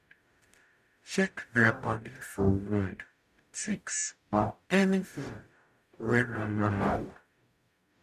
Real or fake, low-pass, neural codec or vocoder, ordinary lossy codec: fake; 14.4 kHz; codec, 44.1 kHz, 0.9 kbps, DAC; MP3, 96 kbps